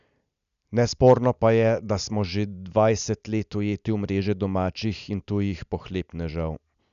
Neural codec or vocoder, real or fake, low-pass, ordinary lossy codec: none; real; 7.2 kHz; none